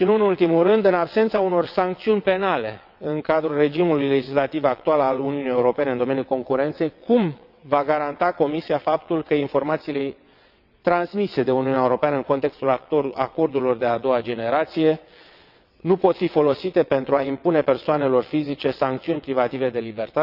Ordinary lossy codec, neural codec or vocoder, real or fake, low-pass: none; vocoder, 22.05 kHz, 80 mel bands, WaveNeXt; fake; 5.4 kHz